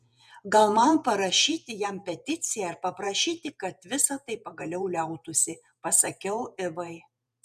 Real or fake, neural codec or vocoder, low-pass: fake; vocoder, 48 kHz, 128 mel bands, Vocos; 14.4 kHz